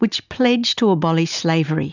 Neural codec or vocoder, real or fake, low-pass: none; real; 7.2 kHz